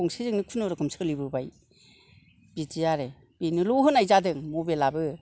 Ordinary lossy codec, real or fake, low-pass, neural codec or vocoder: none; real; none; none